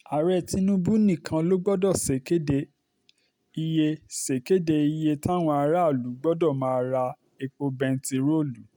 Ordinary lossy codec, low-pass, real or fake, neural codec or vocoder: none; 19.8 kHz; real; none